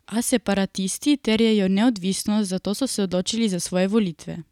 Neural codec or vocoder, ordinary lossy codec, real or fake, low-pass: none; none; real; 19.8 kHz